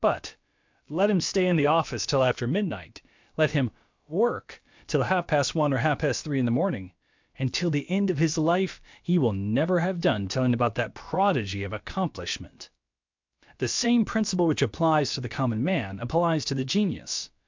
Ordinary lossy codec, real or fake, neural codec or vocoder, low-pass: MP3, 64 kbps; fake; codec, 16 kHz, about 1 kbps, DyCAST, with the encoder's durations; 7.2 kHz